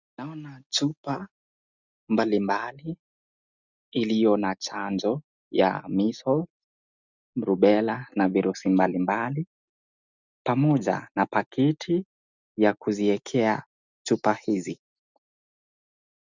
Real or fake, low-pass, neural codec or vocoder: real; 7.2 kHz; none